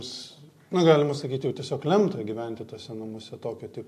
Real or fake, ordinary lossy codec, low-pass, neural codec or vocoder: real; AAC, 64 kbps; 14.4 kHz; none